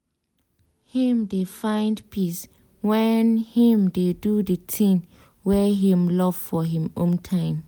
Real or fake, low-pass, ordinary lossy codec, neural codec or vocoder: real; none; none; none